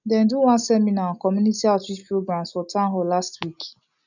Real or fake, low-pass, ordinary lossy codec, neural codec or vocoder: real; 7.2 kHz; none; none